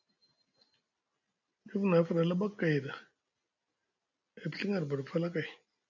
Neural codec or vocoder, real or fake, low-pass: none; real; 7.2 kHz